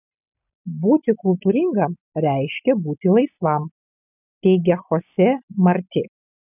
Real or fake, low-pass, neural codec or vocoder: real; 3.6 kHz; none